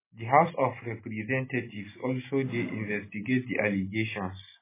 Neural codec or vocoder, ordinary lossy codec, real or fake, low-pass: none; MP3, 16 kbps; real; 3.6 kHz